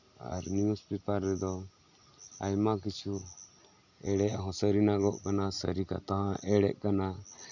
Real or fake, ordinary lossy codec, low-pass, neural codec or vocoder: real; none; 7.2 kHz; none